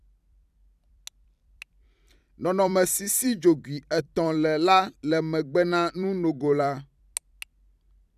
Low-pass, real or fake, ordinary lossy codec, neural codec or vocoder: 14.4 kHz; fake; none; vocoder, 44.1 kHz, 128 mel bands every 256 samples, BigVGAN v2